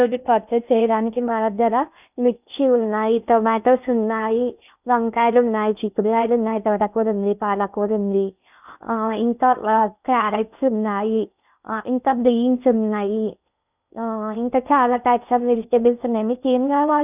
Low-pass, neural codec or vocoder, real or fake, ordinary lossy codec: 3.6 kHz; codec, 16 kHz in and 24 kHz out, 0.6 kbps, FocalCodec, streaming, 2048 codes; fake; none